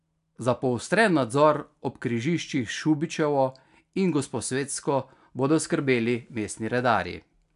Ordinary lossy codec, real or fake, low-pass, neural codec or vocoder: AAC, 96 kbps; real; 10.8 kHz; none